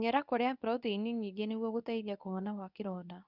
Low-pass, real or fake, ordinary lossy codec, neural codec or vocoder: 5.4 kHz; fake; none; codec, 24 kHz, 0.9 kbps, WavTokenizer, medium speech release version 2